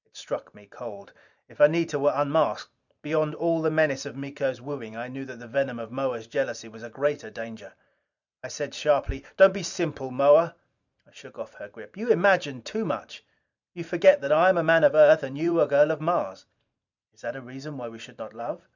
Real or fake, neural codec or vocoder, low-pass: fake; vocoder, 44.1 kHz, 128 mel bands every 512 samples, BigVGAN v2; 7.2 kHz